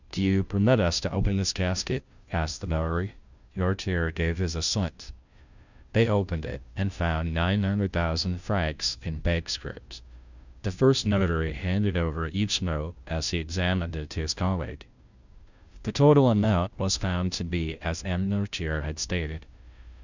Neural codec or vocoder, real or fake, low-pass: codec, 16 kHz, 0.5 kbps, FunCodec, trained on Chinese and English, 25 frames a second; fake; 7.2 kHz